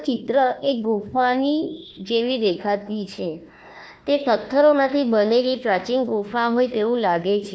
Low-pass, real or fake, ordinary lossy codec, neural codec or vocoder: none; fake; none; codec, 16 kHz, 1 kbps, FunCodec, trained on Chinese and English, 50 frames a second